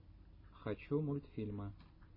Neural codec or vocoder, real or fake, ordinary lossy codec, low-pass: none; real; MP3, 24 kbps; 5.4 kHz